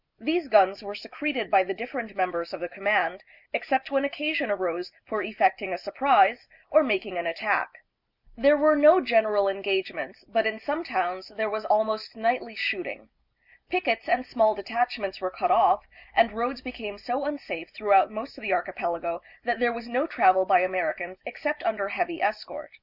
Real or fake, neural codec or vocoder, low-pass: real; none; 5.4 kHz